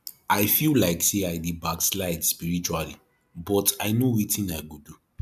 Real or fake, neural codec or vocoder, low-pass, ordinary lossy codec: real; none; 14.4 kHz; none